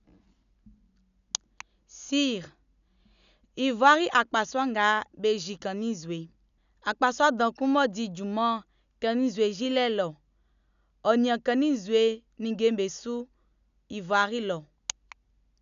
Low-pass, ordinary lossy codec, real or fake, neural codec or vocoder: 7.2 kHz; none; real; none